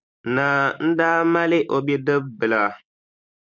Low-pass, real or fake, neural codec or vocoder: 7.2 kHz; real; none